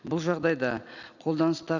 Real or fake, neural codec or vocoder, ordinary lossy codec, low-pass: real; none; Opus, 64 kbps; 7.2 kHz